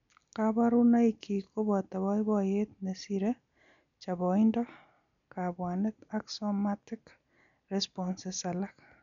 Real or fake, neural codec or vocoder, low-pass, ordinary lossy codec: real; none; 7.2 kHz; MP3, 96 kbps